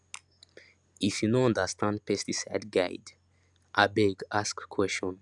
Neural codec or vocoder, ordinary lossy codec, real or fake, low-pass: none; none; real; 10.8 kHz